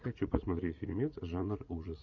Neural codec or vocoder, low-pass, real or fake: vocoder, 44.1 kHz, 80 mel bands, Vocos; 7.2 kHz; fake